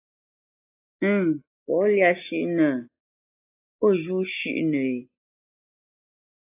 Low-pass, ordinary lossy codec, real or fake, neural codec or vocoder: 3.6 kHz; AAC, 24 kbps; real; none